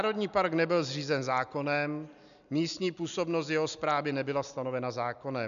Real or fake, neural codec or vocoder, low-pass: real; none; 7.2 kHz